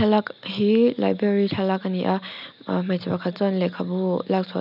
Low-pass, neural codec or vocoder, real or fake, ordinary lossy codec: 5.4 kHz; none; real; none